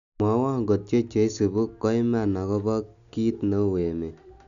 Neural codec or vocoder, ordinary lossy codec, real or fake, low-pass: none; none; real; 7.2 kHz